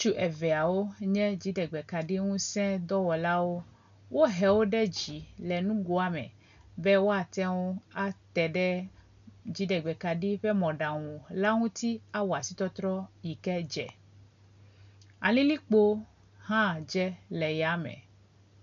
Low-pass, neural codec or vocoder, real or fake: 7.2 kHz; none; real